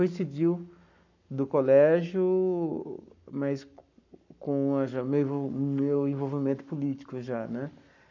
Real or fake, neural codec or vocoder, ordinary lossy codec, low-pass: fake; codec, 44.1 kHz, 7.8 kbps, Pupu-Codec; none; 7.2 kHz